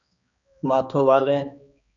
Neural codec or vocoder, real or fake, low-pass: codec, 16 kHz, 2 kbps, X-Codec, HuBERT features, trained on balanced general audio; fake; 7.2 kHz